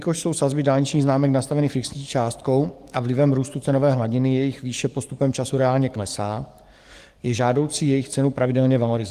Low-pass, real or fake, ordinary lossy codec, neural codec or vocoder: 14.4 kHz; fake; Opus, 24 kbps; codec, 44.1 kHz, 7.8 kbps, DAC